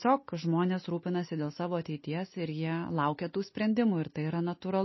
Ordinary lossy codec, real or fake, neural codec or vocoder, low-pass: MP3, 24 kbps; real; none; 7.2 kHz